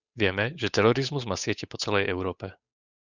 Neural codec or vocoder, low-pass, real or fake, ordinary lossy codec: codec, 16 kHz, 8 kbps, FunCodec, trained on Chinese and English, 25 frames a second; 7.2 kHz; fake; Opus, 64 kbps